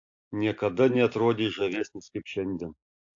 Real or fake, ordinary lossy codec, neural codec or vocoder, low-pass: real; AAC, 48 kbps; none; 7.2 kHz